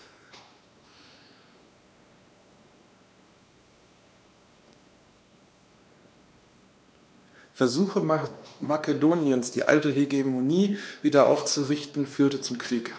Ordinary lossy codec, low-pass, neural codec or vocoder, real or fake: none; none; codec, 16 kHz, 2 kbps, X-Codec, WavLM features, trained on Multilingual LibriSpeech; fake